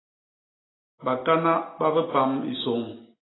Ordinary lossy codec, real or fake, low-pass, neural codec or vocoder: AAC, 16 kbps; real; 7.2 kHz; none